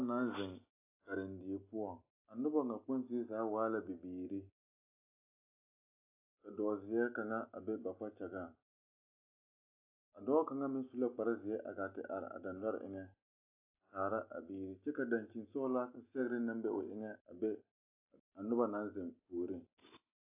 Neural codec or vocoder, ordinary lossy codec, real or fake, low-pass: none; AAC, 24 kbps; real; 3.6 kHz